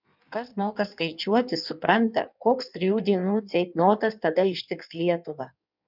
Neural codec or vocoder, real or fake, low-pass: codec, 16 kHz in and 24 kHz out, 1.1 kbps, FireRedTTS-2 codec; fake; 5.4 kHz